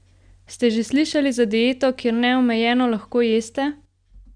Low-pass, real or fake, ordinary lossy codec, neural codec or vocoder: 9.9 kHz; real; Opus, 64 kbps; none